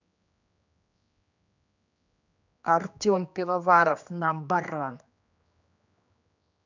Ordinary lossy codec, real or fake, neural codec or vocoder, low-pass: none; fake; codec, 16 kHz, 2 kbps, X-Codec, HuBERT features, trained on general audio; 7.2 kHz